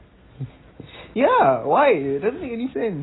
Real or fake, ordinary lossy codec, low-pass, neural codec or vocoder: real; AAC, 16 kbps; 7.2 kHz; none